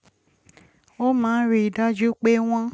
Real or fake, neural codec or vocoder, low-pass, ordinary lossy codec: real; none; none; none